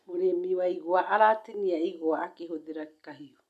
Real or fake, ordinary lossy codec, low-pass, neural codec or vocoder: real; none; 14.4 kHz; none